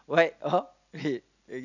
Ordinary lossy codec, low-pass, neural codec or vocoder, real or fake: none; 7.2 kHz; none; real